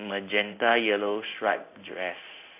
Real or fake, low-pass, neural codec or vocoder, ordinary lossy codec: fake; 3.6 kHz; codec, 16 kHz in and 24 kHz out, 1 kbps, XY-Tokenizer; AAC, 32 kbps